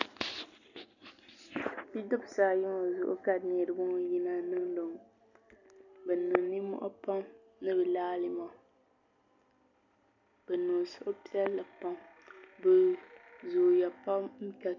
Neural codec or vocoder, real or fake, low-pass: none; real; 7.2 kHz